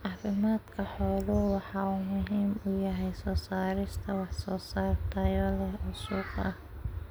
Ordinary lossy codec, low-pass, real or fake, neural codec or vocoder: none; none; real; none